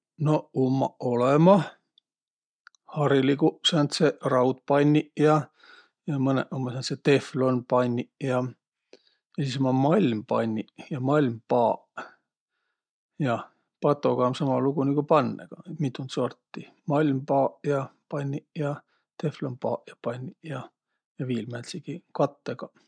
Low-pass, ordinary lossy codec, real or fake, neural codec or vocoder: 9.9 kHz; MP3, 96 kbps; real; none